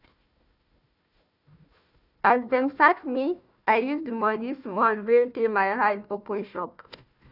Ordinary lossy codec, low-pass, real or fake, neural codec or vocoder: none; 5.4 kHz; fake; codec, 16 kHz, 1 kbps, FunCodec, trained on Chinese and English, 50 frames a second